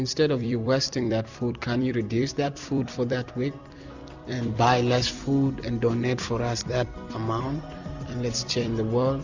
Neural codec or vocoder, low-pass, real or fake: vocoder, 44.1 kHz, 128 mel bands every 256 samples, BigVGAN v2; 7.2 kHz; fake